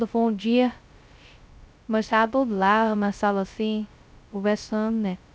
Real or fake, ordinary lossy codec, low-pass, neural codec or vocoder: fake; none; none; codec, 16 kHz, 0.2 kbps, FocalCodec